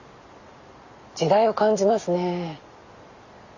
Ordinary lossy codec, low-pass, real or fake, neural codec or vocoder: Opus, 64 kbps; 7.2 kHz; real; none